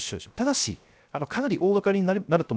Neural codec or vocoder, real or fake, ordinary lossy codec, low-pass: codec, 16 kHz, 0.7 kbps, FocalCodec; fake; none; none